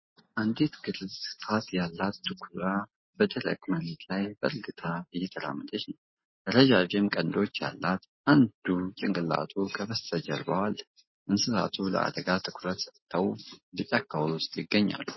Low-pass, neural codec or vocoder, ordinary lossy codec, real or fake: 7.2 kHz; none; MP3, 24 kbps; real